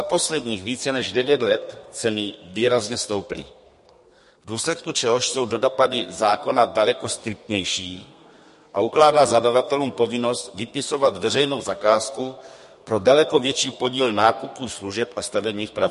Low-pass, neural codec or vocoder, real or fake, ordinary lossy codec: 14.4 kHz; codec, 32 kHz, 1.9 kbps, SNAC; fake; MP3, 48 kbps